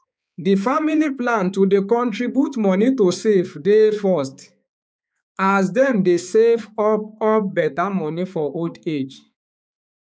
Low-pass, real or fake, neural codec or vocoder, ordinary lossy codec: none; fake; codec, 16 kHz, 4 kbps, X-Codec, HuBERT features, trained on balanced general audio; none